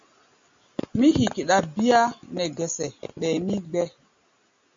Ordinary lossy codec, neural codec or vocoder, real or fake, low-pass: MP3, 48 kbps; none; real; 7.2 kHz